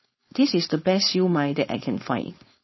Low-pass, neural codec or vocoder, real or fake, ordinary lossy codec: 7.2 kHz; codec, 16 kHz, 4.8 kbps, FACodec; fake; MP3, 24 kbps